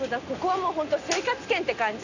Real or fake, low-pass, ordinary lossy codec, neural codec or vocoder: real; 7.2 kHz; none; none